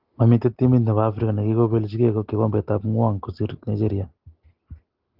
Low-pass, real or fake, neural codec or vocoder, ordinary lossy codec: 5.4 kHz; real; none; Opus, 16 kbps